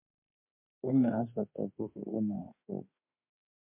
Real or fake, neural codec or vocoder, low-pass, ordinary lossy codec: fake; autoencoder, 48 kHz, 32 numbers a frame, DAC-VAE, trained on Japanese speech; 3.6 kHz; MP3, 24 kbps